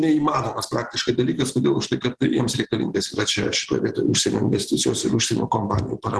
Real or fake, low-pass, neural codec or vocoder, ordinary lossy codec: fake; 10.8 kHz; vocoder, 44.1 kHz, 128 mel bands every 512 samples, BigVGAN v2; Opus, 16 kbps